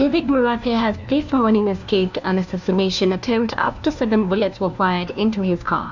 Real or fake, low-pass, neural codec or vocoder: fake; 7.2 kHz; codec, 16 kHz, 1 kbps, FunCodec, trained on LibriTTS, 50 frames a second